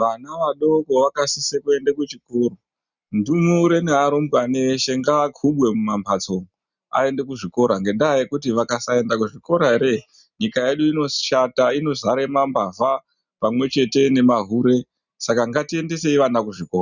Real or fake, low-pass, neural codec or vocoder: real; 7.2 kHz; none